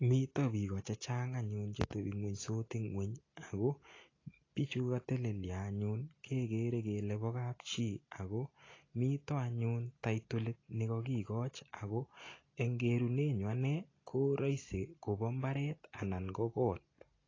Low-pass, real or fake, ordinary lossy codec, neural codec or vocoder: 7.2 kHz; real; AAC, 32 kbps; none